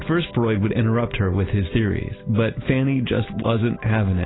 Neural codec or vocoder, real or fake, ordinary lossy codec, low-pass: none; real; AAC, 16 kbps; 7.2 kHz